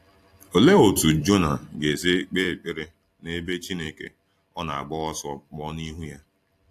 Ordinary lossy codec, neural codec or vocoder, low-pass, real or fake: AAC, 64 kbps; vocoder, 44.1 kHz, 128 mel bands every 256 samples, BigVGAN v2; 14.4 kHz; fake